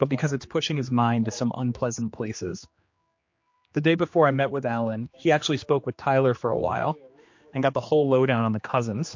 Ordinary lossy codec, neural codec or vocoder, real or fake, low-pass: MP3, 48 kbps; codec, 16 kHz, 2 kbps, X-Codec, HuBERT features, trained on general audio; fake; 7.2 kHz